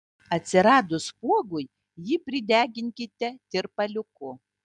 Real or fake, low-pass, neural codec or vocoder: real; 10.8 kHz; none